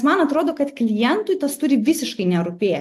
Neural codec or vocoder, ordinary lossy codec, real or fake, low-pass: none; AAC, 64 kbps; real; 14.4 kHz